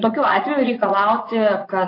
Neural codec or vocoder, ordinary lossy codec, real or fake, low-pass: vocoder, 24 kHz, 100 mel bands, Vocos; AAC, 32 kbps; fake; 5.4 kHz